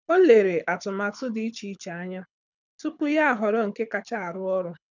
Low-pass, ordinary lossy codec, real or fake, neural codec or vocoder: 7.2 kHz; none; fake; codec, 24 kHz, 6 kbps, HILCodec